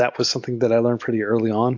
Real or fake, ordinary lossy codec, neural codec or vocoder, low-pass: real; MP3, 48 kbps; none; 7.2 kHz